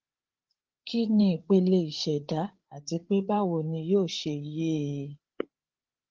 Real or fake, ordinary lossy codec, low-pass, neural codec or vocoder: fake; Opus, 16 kbps; 7.2 kHz; codec, 16 kHz, 8 kbps, FreqCodec, larger model